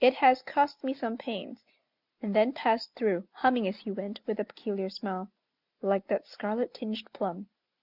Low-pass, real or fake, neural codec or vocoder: 5.4 kHz; real; none